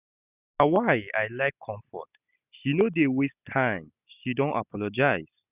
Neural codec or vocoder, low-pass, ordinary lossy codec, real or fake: none; 3.6 kHz; none; real